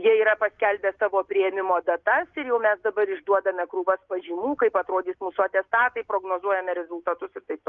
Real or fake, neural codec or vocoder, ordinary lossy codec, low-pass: real; none; Opus, 32 kbps; 7.2 kHz